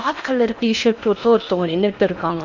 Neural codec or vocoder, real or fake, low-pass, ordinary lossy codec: codec, 16 kHz in and 24 kHz out, 0.8 kbps, FocalCodec, streaming, 65536 codes; fake; 7.2 kHz; none